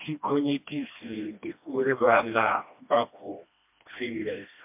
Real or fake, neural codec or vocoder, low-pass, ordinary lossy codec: fake; codec, 16 kHz, 1 kbps, FreqCodec, smaller model; 3.6 kHz; MP3, 32 kbps